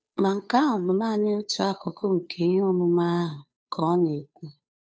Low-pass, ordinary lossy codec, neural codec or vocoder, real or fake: none; none; codec, 16 kHz, 2 kbps, FunCodec, trained on Chinese and English, 25 frames a second; fake